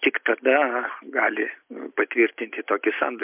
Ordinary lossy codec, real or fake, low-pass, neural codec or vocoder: MP3, 32 kbps; real; 3.6 kHz; none